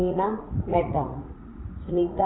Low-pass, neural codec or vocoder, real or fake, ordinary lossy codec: 7.2 kHz; vocoder, 44.1 kHz, 80 mel bands, Vocos; fake; AAC, 16 kbps